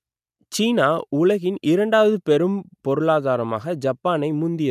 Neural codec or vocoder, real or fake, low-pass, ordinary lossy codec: none; real; 14.4 kHz; none